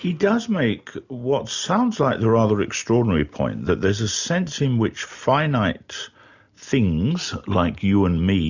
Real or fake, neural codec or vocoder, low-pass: real; none; 7.2 kHz